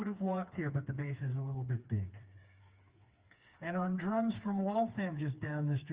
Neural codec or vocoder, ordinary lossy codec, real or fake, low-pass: codec, 16 kHz, 4 kbps, FreqCodec, smaller model; Opus, 24 kbps; fake; 3.6 kHz